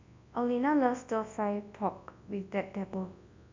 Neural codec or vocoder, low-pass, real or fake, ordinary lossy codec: codec, 24 kHz, 0.9 kbps, WavTokenizer, large speech release; 7.2 kHz; fake; MP3, 64 kbps